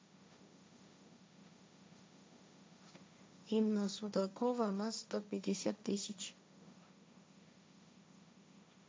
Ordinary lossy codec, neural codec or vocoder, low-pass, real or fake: none; codec, 16 kHz, 1.1 kbps, Voila-Tokenizer; none; fake